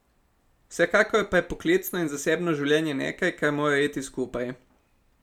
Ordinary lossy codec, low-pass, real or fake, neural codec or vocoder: none; 19.8 kHz; real; none